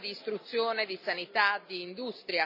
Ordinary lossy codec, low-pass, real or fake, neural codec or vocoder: none; 5.4 kHz; real; none